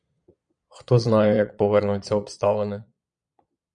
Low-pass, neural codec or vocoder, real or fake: 9.9 kHz; vocoder, 22.05 kHz, 80 mel bands, Vocos; fake